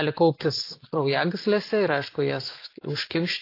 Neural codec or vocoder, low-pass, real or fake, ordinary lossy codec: none; 5.4 kHz; real; AAC, 32 kbps